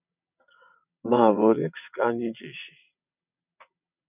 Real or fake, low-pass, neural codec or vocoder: fake; 3.6 kHz; vocoder, 22.05 kHz, 80 mel bands, WaveNeXt